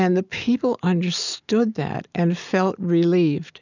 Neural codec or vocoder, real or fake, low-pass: none; real; 7.2 kHz